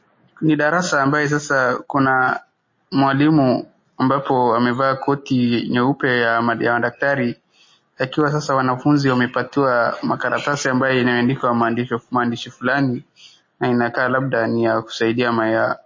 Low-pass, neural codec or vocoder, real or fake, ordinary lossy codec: 7.2 kHz; none; real; MP3, 32 kbps